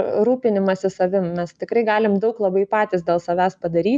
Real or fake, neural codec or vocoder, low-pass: real; none; 9.9 kHz